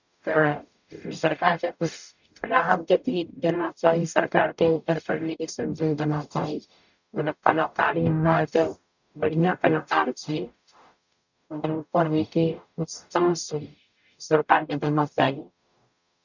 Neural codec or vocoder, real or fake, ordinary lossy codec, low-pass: codec, 44.1 kHz, 0.9 kbps, DAC; fake; none; 7.2 kHz